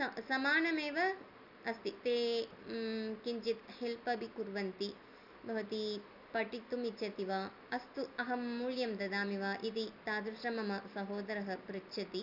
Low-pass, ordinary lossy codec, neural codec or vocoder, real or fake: 5.4 kHz; none; none; real